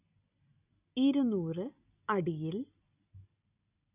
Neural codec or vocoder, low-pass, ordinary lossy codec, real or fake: none; 3.6 kHz; none; real